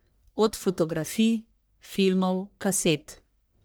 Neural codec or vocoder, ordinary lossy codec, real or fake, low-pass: codec, 44.1 kHz, 1.7 kbps, Pupu-Codec; none; fake; none